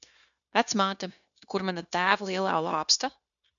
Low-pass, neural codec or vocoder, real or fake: 7.2 kHz; codec, 16 kHz, 0.9 kbps, LongCat-Audio-Codec; fake